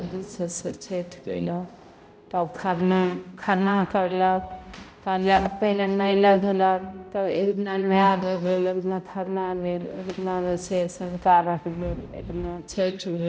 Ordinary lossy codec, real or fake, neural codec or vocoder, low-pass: none; fake; codec, 16 kHz, 0.5 kbps, X-Codec, HuBERT features, trained on balanced general audio; none